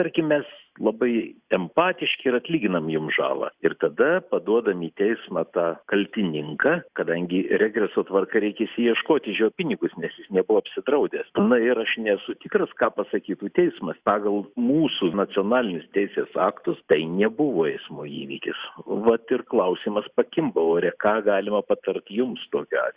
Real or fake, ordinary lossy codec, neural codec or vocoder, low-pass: real; Opus, 64 kbps; none; 3.6 kHz